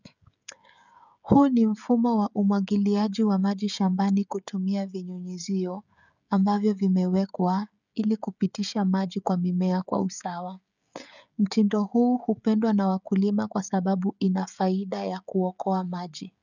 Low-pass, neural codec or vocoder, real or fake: 7.2 kHz; codec, 16 kHz, 16 kbps, FreqCodec, smaller model; fake